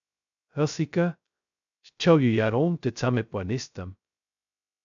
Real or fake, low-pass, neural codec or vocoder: fake; 7.2 kHz; codec, 16 kHz, 0.2 kbps, FocalCodec